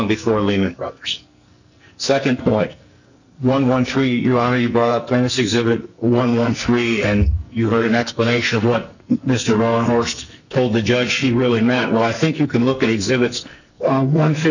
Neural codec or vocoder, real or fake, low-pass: codec, 44.1 kHz, 2.6 kbps, SNAC; fake; 7.2 kHz